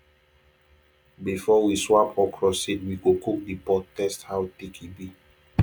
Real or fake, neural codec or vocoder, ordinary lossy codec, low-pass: real; none; none; 19.8 kHz